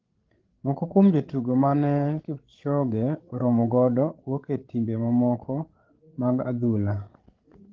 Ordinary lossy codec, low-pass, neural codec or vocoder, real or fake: Opus, 16 kbps; 7.2 kHz; codec, 16 kHz, 16 kbps, FreqCodec, larger model; fake